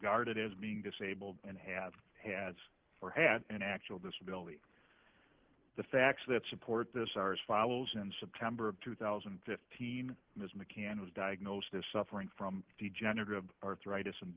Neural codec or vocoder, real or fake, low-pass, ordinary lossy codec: none; real; 3.6 kHz; Opus, 16 kbps